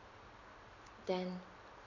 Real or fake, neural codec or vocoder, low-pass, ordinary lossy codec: real; none; 7.2 kHz; none